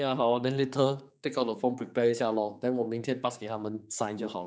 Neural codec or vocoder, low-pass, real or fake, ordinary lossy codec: codec, 16 kHz, 4 kbps, X-Codec, HuBERT features, trained on general audio; none; fake; none